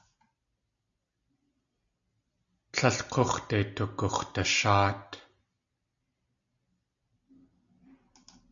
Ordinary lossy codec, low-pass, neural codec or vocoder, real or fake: MP3, 96 kbps; 7.2 kHz; none; real